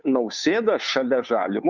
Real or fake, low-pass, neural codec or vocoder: fake; 7.2 kHz; codec, 16 kHz, 2 kbps, FunCodec, trained on Chinese and English, 25 frames a second